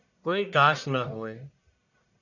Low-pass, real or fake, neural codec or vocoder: 7.2 kHz; fake; codec, 44.1 kHz, 1.7 kbps, Pupu-Codec